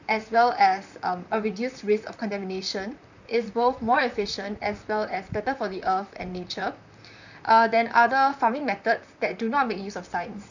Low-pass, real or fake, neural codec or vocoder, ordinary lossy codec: 7.2 kHz; fake; vocoder, 44.1 kHz, 128 mel bands, Pupu-Vocoder; none